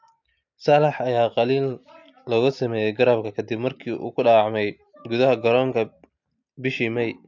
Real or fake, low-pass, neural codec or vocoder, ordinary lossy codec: real; 7.2 kHz; none; MP3, 64 kbps